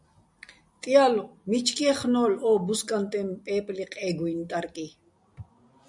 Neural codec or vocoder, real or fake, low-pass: none; real; 10.8 kHz